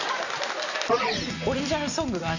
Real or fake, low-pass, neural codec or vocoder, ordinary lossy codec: real; 7.2 kHz; none; none